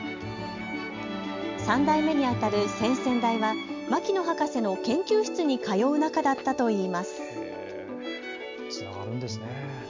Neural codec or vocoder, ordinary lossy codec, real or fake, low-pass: none; MP3, 64 kbps; real; 7.2 kHz